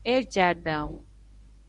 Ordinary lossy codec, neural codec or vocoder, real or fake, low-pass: Opus, 64 kbps; codec, 24 kHz, 0.9 kbps, WavTokenizer, medium speech release version 1; fake; 10.8 kHz